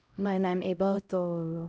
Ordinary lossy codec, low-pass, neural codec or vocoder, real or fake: none; none; codec, 16 kHz, 0.5 kbps, X-Codec, HuBERT features, trained on LibriSpeech; fake